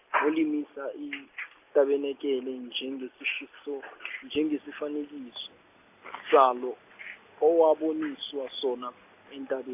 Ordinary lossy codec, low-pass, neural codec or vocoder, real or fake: AAC, 32 kbps; 3.6 kHz; none; real